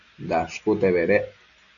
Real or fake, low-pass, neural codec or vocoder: real; 7.2 kHz; none